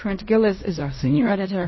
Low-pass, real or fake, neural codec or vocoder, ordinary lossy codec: 7.2 kHz; fake; codec, 16 kHz in and 24 kHz out, 0.4 kbps, LongCat-Audio-Codec, fine tuned four codebook decoder; MP3, 24 kbps